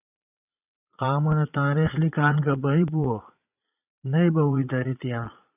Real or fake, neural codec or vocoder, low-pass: fake; vocoder, 22.05 kHz, 80 mel bands, Vocos; 3.6 kHz